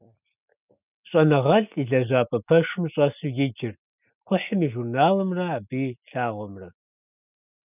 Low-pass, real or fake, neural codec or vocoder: 3.6 kHz; real; none